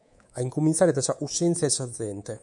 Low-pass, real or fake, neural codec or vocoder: 10.8 kHz; fake; codec, 24 kHz, 3.1 kbps, DualCodec